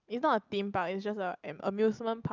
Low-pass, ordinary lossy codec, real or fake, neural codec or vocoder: 7.2 kHz; Opus, 24 kbps; real; none